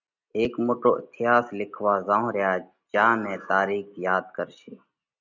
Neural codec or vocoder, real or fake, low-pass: none; real; 7.2 kHz